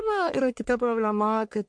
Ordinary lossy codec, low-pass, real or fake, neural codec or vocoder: MP3, 64 kbps; 9.9 kHz; fake; codec, 44.1 kHz, 1.7 kbps, Pupu-Codec